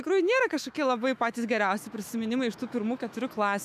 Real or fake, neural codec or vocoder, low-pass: fake; autoencoder, 48 kHz, 128 numbers a frame, DAC-VAE, trained on Japanese speech; 14.4 kHz